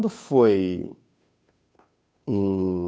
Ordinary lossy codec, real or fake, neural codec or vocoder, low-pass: none; fake; codec, 16 kHz, 2 kbps, FunCodec, trained on Chinese and English, 25 frames a second; none